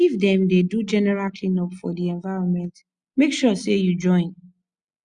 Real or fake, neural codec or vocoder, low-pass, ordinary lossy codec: real; none; 9.9 kHz; Opus, 64 kbps